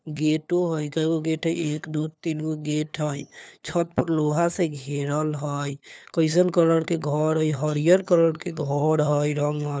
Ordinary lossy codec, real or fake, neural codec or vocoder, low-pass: none; fake; codec, 16 kHz, 4 kbps, FunCodec, trained on LibriTTS, 50 frames a second; none